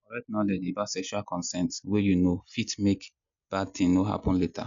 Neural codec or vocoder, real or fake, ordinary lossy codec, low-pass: none; real; MP3, 64 kbps; 7.2 kHz